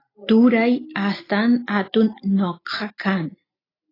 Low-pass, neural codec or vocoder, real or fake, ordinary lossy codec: 5.4 kHz; none; real; AAC, 24 kbps